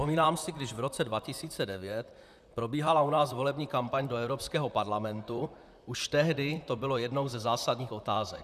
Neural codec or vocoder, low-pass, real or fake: vocoder, 44.1 kHz, 128 mel bands, Pupu-Vocoder; 14.4 kHz; fake